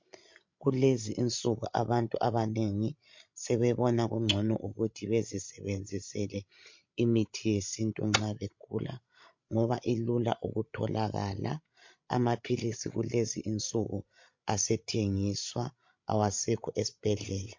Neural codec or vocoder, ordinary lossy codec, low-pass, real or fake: codec, 16 kHz, 16 kbps, FreqCodec, larger model; MP3, 48 kbps; 7.2 kHz; fake